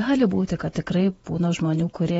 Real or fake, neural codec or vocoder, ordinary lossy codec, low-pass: fake; vocoder, 48 kHz, 128 mel bands, Vocos; AAC, 24 kbps; 19.8 kHz